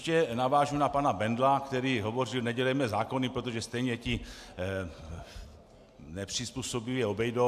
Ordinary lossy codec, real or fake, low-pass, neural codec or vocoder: AAC, 96 kbps; real; 14.4 kHz; none